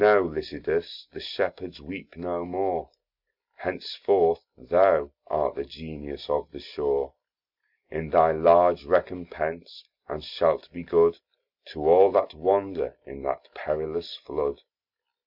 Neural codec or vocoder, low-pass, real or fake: none; 5.4 kHz; real